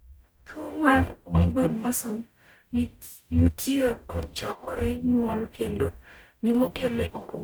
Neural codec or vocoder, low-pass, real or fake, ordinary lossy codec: codec, 44.1 kHz, 0.9 kbps, DAC; none; fake; none